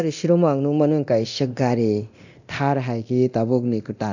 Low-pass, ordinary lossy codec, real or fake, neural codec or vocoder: 7.2 kHz; none; fake; codec, 24 kHz, 0.9 kbps, DualCodec